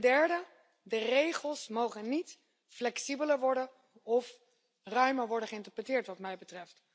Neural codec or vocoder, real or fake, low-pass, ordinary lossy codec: none; real; none; none